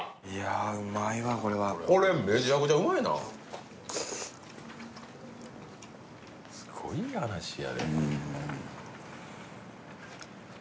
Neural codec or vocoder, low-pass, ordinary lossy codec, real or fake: none; none; none; real